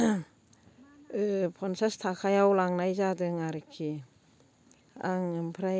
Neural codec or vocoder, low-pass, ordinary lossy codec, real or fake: none; none; none; real